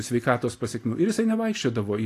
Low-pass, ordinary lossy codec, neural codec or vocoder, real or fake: 14.4 kHz; AAC, 48 kbps; none; real